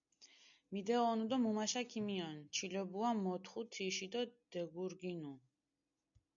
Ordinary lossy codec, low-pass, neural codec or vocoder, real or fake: MP3, 96 kbps; 7.2 kHz; none; real